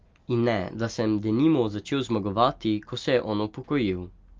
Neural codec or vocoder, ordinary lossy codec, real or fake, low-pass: none; Opus, 32 kbps; real; 7.2 kHz